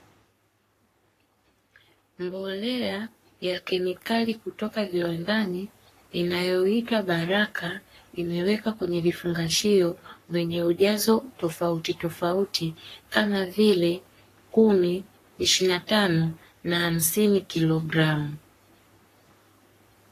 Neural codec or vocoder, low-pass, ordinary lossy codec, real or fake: codec, 44.1 kHz, 3.4 kbps, Pupu-Codec; 14.4 kHz; AAC, 48 kbps; fake